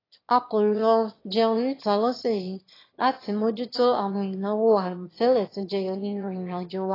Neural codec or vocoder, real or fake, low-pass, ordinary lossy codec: autoencoder, 22.05 kHz, a latent of 192 numbers a frame, VITS, trained on one speaker; fake; 5.4 kHz; AAC, 24 kbps